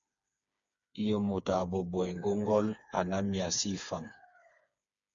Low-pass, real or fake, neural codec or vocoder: 7.2 kHz; fake; codec, 16 kHz, 4 kbps, FreqCodec, smaller model